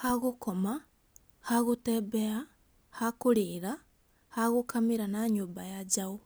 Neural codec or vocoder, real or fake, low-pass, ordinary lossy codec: none; real; none; none